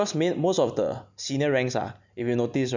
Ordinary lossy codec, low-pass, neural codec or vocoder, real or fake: none; 7.2 kHz; none; real